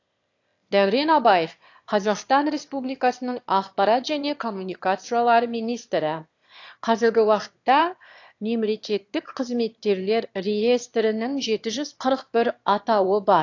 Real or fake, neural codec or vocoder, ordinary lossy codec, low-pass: fake; autoencoder, 22.05 kHz, a latent of 192 numbers a frame, VITS, trained on one speaker; AAC, 48 kbps; 7.2 kHz